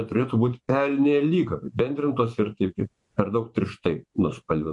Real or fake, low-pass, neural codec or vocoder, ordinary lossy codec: fake; 10.8 kHz; vocoder, 24 kHz, 100 mel bands, Vocos; MP3, 96 kbps